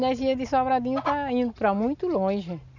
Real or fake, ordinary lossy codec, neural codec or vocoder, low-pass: real; none; none; 7.2 kHz